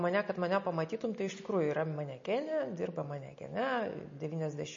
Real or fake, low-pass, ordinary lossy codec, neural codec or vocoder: real; 7.2 kHz; MP3, 32 kbps; none